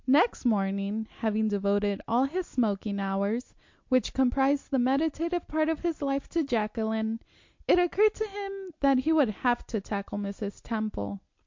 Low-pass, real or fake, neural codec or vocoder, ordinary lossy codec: 7.2 kHz; real; none; MP3, 48 kbps